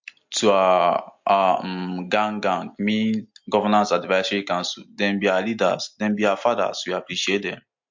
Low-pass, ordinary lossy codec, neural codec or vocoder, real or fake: 7.2 kHz; MP3, 48 kbps; none; real